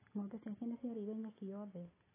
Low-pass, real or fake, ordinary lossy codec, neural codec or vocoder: 3.6 kHz; real; AAC, 16 kbps; none